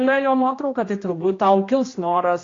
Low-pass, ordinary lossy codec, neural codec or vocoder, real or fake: 7.2 kHz; AAC, 32 kbps; codec, 16 kHz, 1 kbps, X-Codec, HuBERT features, trained on general audio; fake